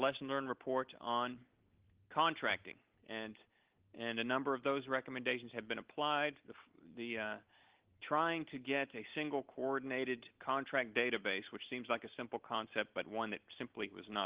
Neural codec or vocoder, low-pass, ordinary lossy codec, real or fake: none; 3.6 kHz; Opus, 16 kbps; real